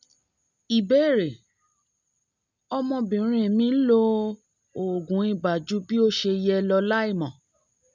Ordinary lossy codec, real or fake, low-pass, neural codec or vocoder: none; real; 7.2 kHz; none